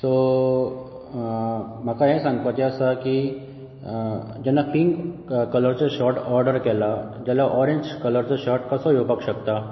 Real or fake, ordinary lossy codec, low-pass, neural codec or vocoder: real; MP3, 24 kbps; 7.2 kHz; none